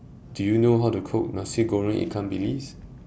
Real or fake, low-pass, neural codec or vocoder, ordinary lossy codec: real; none; none; none